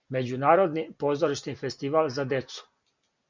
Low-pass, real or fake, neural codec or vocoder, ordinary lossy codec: 7.2 kHz; real; none; MP3, 64 kbps